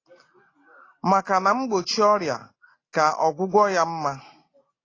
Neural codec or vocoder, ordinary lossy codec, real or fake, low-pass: none; AAC, 32 kbps; real; 7.2 kHz